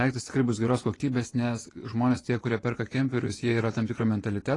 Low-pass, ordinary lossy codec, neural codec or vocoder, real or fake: 10.8 kHz; AAC, 32 kbps; vocoder, 24 kHz, 100 mel bands, Vocos; fake